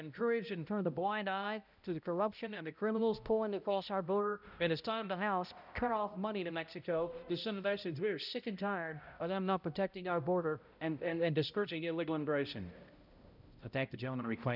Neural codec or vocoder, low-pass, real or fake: codec, 16 kHz, 0.5 kbps, X-Codec, HuBERT features, trained on balanced general audio; 5.4 kHz; fake